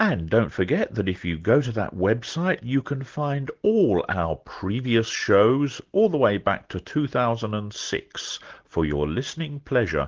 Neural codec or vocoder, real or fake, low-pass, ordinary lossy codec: none; real; 7.2 kHz; Opus, 16 kbps